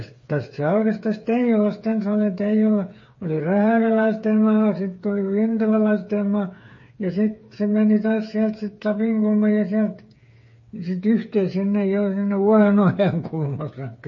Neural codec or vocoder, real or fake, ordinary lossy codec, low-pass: codec, 16 kHz, 8 kbps, FreqCodec, smaller model; fake; MP3, 32 kbps; 7.2 kHz